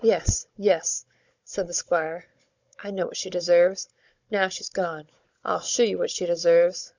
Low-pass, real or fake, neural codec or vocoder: 7.2 kHz; fake; codec, 16 kHz, 16 kbps, FunCodec, trained on Chinese and English, 50 frames a second